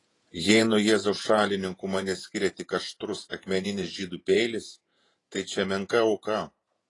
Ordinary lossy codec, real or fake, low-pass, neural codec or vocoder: AAC, 32 kbps; fake; 10.8 kHz; vocoder, 48 kHz, 128 mel bands, Vocos